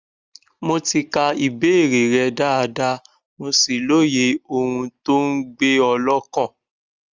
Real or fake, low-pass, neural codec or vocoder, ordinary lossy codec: real; 7.2 kHz; none; Opus, 32 kbps